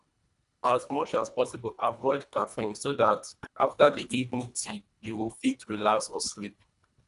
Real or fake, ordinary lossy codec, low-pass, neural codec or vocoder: fake; none; 10.8 kHz; codec, 24 kHz, 1.5 kbps, HILCodec